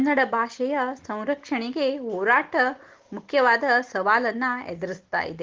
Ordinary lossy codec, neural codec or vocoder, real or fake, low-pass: Opus, 16 kbps; none; real; 7.2 kHz